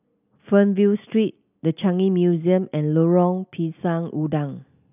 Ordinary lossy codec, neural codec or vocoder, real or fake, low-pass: none; none; real; 3.6 kHz